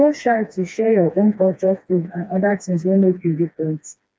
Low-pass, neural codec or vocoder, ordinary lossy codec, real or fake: none; codec, 16 kHz, 2 kbps, FreqCodec, smaller model; none; fake